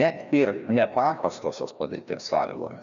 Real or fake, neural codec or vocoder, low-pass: fake; codec, 16 kHz, 1 kbps, FreqCodec, larger model; 7.2 kHz